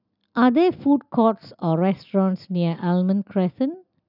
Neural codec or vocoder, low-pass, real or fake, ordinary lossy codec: none; 5.4 kHz; real; none